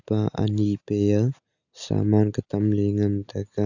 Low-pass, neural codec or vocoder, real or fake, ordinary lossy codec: 7.2 kHz; none; real; none